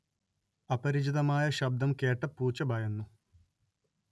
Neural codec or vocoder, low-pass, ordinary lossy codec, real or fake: none; none; none; real